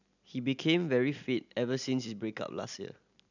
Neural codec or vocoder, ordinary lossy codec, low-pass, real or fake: none; none; 7.2 kHz; real